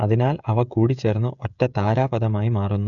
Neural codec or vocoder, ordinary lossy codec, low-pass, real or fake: codec, 16 kHz, 16 kbps, FreqCodec, smaller model; none; 7.2 kHz; fake